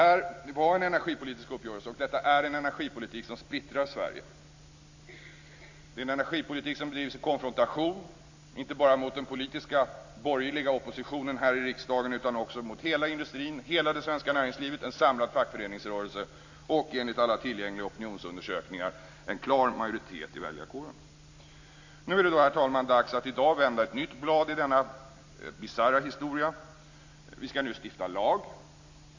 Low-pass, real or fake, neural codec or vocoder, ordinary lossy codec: 7.2 kHz; real; none; AAC, 48 kbps